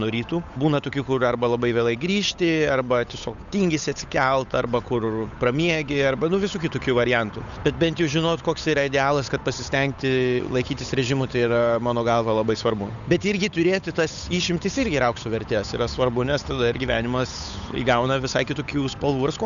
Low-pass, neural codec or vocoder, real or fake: 7.2 kHz; codec, 16 kHz, 8 kbps, FunCodec, trained on Chinese and English, 25 frames a second; fake